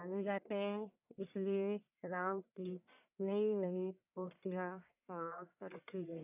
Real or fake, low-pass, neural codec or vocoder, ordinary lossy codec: fake; 3.6 kHz; codec, 44.1 kHz, 1.7 kbps, Pupu-Codec; none